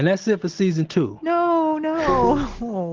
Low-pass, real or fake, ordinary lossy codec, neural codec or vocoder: 7.2 kHz; real; Opus, 16 kbps; none